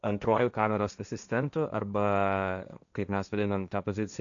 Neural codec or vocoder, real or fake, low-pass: codec, 16 kHz, 1.1 kbps, Voila-Tokenizer; fake; 7.2 kHz